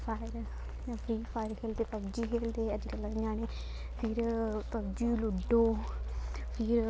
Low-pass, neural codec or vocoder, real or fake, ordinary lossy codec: none; none; real; none